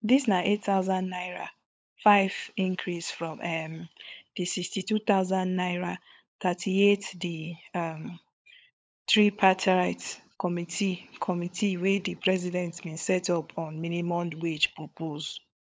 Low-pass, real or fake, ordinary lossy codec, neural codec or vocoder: none; fake; none; codec, 16 kHz, 16 kbps, FunCodec, trained on LibriTTS, 50 frames a second